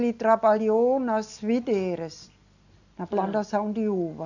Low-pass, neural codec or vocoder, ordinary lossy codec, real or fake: 7.2 kHz; none; none; real